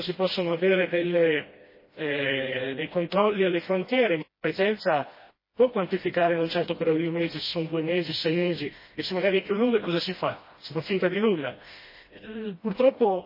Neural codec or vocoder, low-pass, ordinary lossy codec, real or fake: codec, 16 kHz, 1 kbps, FreqCodec, smaller model; 5.4 kHz; MP3, 24 kbps; fake